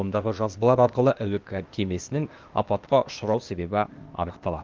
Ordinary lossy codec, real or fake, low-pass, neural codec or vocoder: Opus, 32 kbps; fake; 7.2 kHz; codec, 16 kHz, 0.8 kbps, ZipCodec